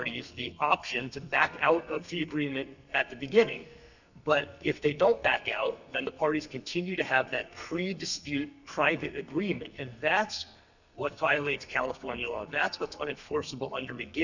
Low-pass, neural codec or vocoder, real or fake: 7.2 kHz; codec, 32 kHz, 1.9 kbps, SNAC; fake